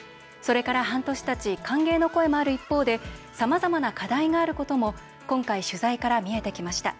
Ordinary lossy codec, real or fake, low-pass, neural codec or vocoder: none; real; none; none